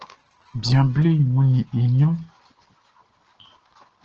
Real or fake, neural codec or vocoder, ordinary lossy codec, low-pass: real; none; Opus, 16 kbps; 7.2 kHz